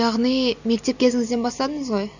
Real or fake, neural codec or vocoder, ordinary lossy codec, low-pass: real; none; none; 7.2 kHz